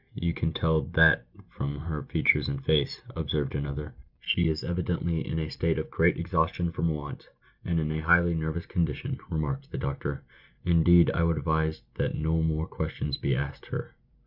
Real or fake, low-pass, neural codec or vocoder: real; 5.4 kHz; none